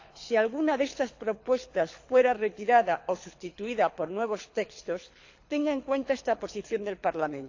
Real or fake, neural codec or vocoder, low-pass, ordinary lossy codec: fake; codec, 24 kHz, 6 kbps, HILCodec; 7.2 kHz; AAC, 48 kbps